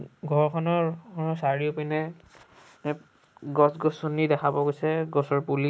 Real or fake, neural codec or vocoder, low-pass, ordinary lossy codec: real; none; none; none